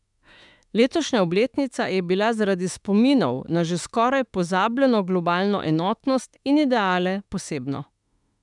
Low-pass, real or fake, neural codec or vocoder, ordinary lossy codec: 10.8 kHz; fake; autoencoder, 48 kHz, 32 numbers a frame, DAC-VAE, trained on Japanese speech; none